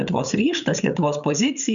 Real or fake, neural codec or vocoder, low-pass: fake; codec, 16 kHz, 8 kbps, FreqCodec, larger model; 7.2 kHz